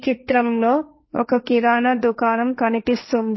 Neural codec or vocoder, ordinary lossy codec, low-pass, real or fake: codec, 16 kHz, 1.1 kbps, Voila-Tokenizer; MP3, 24 kbps; 7.2 kHz; fake